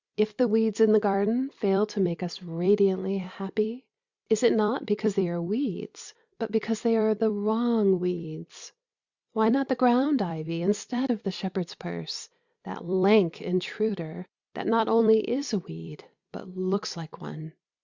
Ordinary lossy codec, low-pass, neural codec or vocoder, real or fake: Opus, 64 kbps; 7.2 kHz; vocoder, 44.1 kHz, 128 mel bands every 256 samples, BigVGAN v2; fake